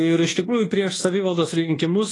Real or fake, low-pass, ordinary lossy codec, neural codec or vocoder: fake; 10.8 kHz; AAC, 32 kbps; codec, 24 kHz, 1.2 kbps, DualCodec